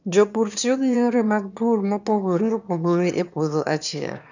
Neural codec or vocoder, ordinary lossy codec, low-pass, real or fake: autoencoder, 22.05 kHz, a latent of 192 numbers a frame, VITS, trained on one speaker; none; 7.2 kHz; fake